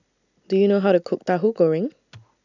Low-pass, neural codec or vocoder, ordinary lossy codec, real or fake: 7.2 kHz; none; none; real